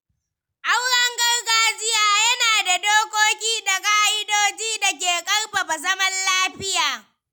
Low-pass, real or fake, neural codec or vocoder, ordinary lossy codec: none; real; none; none